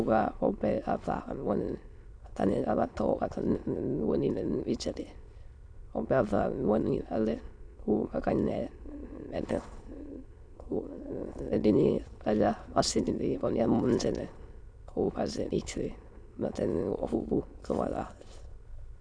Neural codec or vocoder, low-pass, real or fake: autoencoder, 22.05 kHz, a latent of 192 numbers a frame, VITS, trained on many speakers; 9.9 kHz; fake